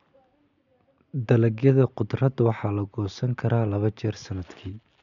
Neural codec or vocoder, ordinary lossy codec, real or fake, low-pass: none; none; real; 7.2 kHz